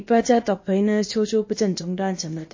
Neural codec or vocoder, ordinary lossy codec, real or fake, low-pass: codec, 16 kHz, about 1 kbps, DyCAST, with the encoder's durations; MP3, 32 kbps; fake; 7.2 kHz